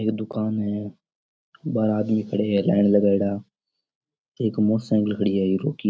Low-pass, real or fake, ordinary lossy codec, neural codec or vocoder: none; real; none; none